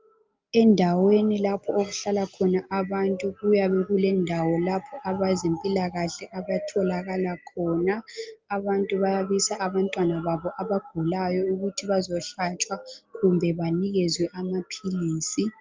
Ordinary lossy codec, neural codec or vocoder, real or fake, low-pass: Opus, 24 kbps; none; real; 7.2 kHz